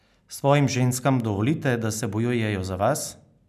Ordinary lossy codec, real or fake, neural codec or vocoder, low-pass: none; real; none; 14.4 kHz